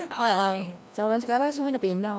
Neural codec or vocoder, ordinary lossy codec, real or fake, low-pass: codec, 16 kHz, 1 kbps, FreqCodec, larger model; none; fake; none